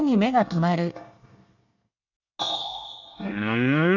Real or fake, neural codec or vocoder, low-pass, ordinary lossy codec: fake; codec, 24 kHz, 1 kbps, SNAC; 7.2 kHz; none